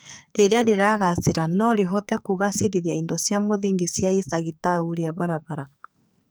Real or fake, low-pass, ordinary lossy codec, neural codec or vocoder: fake; none; none; codec, 44.1 kHz, 2.6 kbps, SNAC